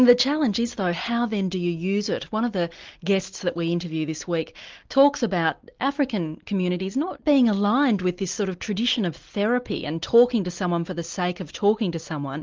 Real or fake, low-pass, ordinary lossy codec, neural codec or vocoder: real; 7.2 kHz; Opus, 32 kbps; none